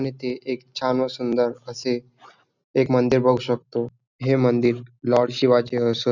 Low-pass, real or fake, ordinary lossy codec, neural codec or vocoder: 7.2 kHz; real; none; none